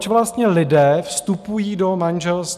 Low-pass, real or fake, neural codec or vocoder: 14.4 kHz; real; none